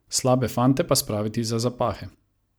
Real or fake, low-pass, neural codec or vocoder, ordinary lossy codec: real; none; none; none